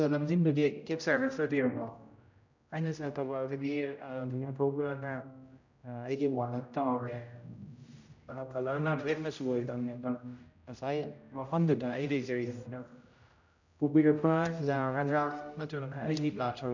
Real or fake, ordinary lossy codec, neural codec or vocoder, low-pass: fake; none; codec, 16 kHz, 0.5 kbps, X-Codec, HuBERT features, trained on general audio; 7.2 kHz